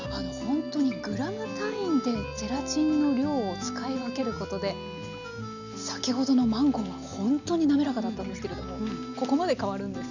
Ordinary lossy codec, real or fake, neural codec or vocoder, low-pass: none; real; none; 7.2 kHz